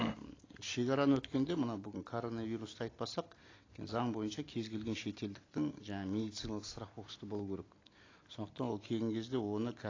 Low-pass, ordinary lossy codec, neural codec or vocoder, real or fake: 7.2 kHz; AAC, 32 kbps; none; real